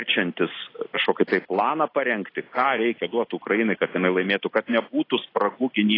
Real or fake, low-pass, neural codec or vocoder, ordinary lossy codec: real; 5.4 kHz; none; AAC, 24 kbps